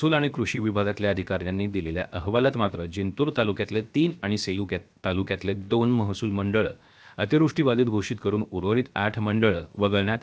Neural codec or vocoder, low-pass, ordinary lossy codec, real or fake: codec, 16 kHz, 0.7 kbps, FocalCodec; none; none; fake